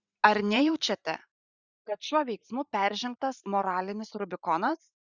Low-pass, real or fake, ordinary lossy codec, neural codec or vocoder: 7.2 kHz; fake; Opus, 64 kbps; vocoder, 44.1 kHz, 128 mel bands every 512 samples, BigVGAN v2